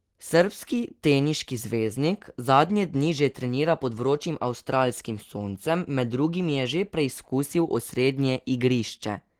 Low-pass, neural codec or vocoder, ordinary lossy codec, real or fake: 19.8 kHz; none; Opus, 16 kbps; real